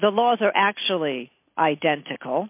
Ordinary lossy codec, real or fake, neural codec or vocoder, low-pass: MP3, 32 kbps; real; none; 3.6 kHz